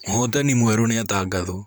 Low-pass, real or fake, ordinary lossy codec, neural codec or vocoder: none; fake; none; vocoder, 44.1 kHz, 128 mel bands, Pupu-Vocoder